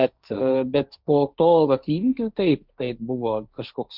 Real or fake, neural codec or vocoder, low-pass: fake; codec, 16 kHz, 1.1 kbps, Voila-Tokenizer; 5.4 kHz